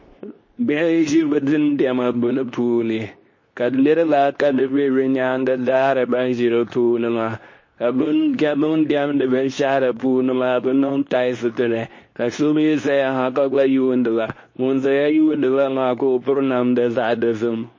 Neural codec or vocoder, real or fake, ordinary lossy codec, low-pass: codec, 24 kHz, 0.9 kbps, WavTokenizer, medium speech release version 2; fake; MP3, 32 kbps; 7.2 kHz